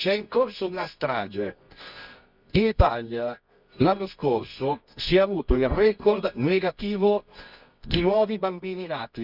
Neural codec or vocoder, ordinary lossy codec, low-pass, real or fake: codec, 24 kHz, 0.9 kbps, WavTokenizer, medium music audio release; none; 5.4 kHz; fake